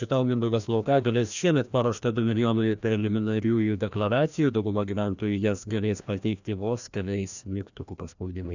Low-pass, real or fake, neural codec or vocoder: 7.2 kHz; fake; codec, 16 kHz, 1 kbps, FreqCodec, larger model